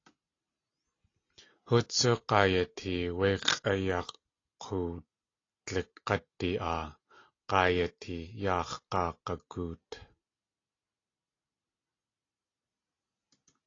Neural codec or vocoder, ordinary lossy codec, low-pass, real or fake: none; AAC, 32 kbps; 7.2 kHz; real